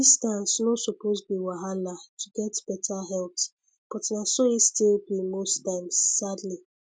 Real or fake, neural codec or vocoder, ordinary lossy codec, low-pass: real; none; none; none